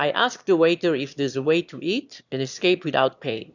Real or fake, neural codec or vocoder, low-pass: fake; autoencoder, 22.05 kHz, a latent of 192 numbers a frame, VITS, trained on one speaker; 7.2 kHz